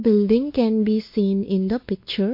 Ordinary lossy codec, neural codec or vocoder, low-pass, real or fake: MP3, 32 kbps; codec, 16 kHz, 2 kbps, X-Codec, WavLM features, trained on Multilingual LibriSpeech; 5.4 kHz; fake